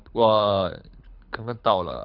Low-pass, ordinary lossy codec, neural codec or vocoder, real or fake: 5.4 kHz; none; codec, 24 kHz, 6 kbps, HILCodec; fake